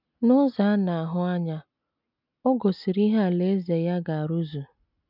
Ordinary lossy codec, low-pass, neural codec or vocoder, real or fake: none; 5.4 kHz; none; real